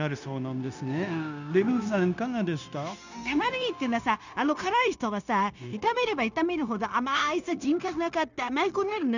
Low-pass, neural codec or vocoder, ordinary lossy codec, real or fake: 7.2 kHz; codec, 16 kHz, 0.9 kbps, LongCat-Audio-Codec; none; fake